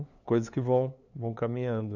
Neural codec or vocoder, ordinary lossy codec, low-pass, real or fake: codec, 16 kHz, 2 kbps, FunCodec, trained on LibriTTS, 25 frames a second; none; 7.2 kHz; fake